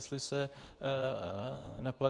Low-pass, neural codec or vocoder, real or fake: 10.8 kHz; codec, 24 kHz, 0.9 kbps, WavTokenizer, medium speech release version 2; fake